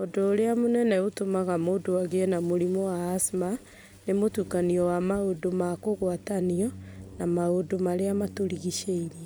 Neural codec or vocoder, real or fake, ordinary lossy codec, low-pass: none; real; none; none